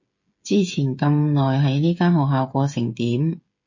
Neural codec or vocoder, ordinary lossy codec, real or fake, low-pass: codec, 16 kHz, 8 kbps, FreqCodec, smaller model; MP3, 32 kbps; fake; 7.2 kHz